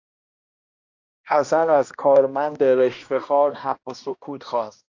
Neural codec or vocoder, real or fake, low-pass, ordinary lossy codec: codec, 16 kHz, 1 kbps, X-Codec, HuBERT features, trained on balanced general audio; fake; 7.2 kHz; AAC, 48 kbps